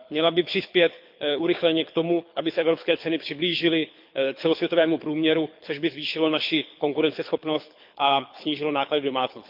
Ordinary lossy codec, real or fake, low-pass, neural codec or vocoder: MP3, 48 kbps; fake; 5.4 kHz; codec, 24 kHz, 6 kbps, HILCodec